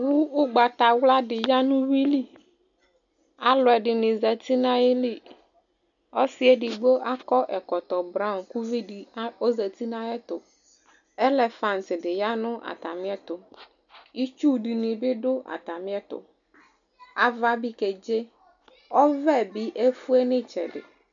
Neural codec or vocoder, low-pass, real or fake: none; 7.2 kHz; real